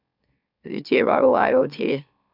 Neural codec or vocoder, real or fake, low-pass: autoencoder, 44.1 kHz, a latent of 192 numbers a frame, MeloTTS; fake; 5.4 kHz